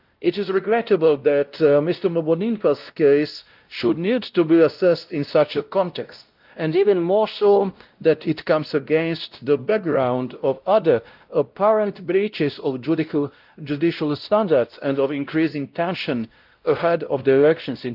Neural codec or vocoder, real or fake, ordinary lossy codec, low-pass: codec, 16 kHz, 0.5 kbps, X-Codec, WavLM features, trained on Multilingual LibriSpeech; fake; Opus, 32 kbps; 5.4 kHz